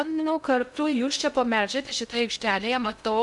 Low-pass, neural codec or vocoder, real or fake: 10.8 kHz; codec, 16 kHz in and 24 kHz out, 0.6 kbps, FocalCodec, streaming, 2048 codes; fake